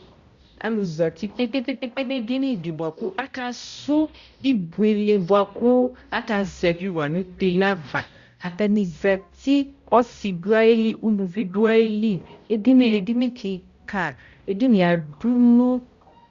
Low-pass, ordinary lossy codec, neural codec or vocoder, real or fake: 7.2 kHz; Opus, 64 kbps; codec, 16 kHz, 0.5 kbps, X-Codec, HuBERT features, trained on balanced general audio; fake